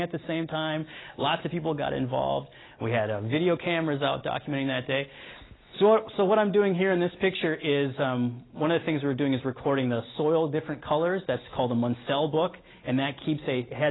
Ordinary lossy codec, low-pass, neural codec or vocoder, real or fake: AAC, 16 kbps; 7.2 kHz; none; real